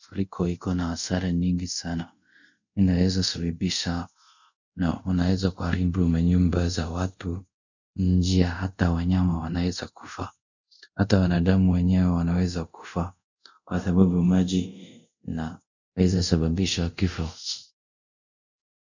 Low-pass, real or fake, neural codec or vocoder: 7.2 kHz; fake; codec, 24 kHz, 0.5 kbps, DualCodec